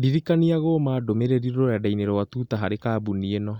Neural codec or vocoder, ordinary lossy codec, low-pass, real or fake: none; Opus, 64 kbps; 19.8 kHz; real